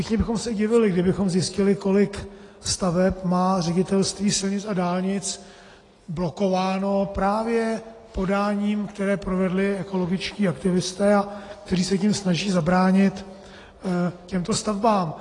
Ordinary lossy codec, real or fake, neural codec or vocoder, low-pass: AAC, 32 kbps; real; none; 10.8 kHz